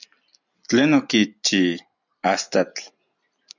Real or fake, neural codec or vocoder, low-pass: real; none; 7.2 kHz